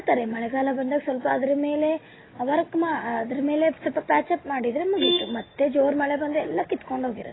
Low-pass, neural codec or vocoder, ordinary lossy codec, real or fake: 7.2 kHz; none; AAC, 16 kbps; real